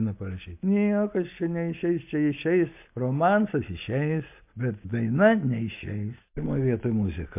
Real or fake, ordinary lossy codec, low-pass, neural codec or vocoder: real; MP3, 32 kbps; 3.6 kHz; none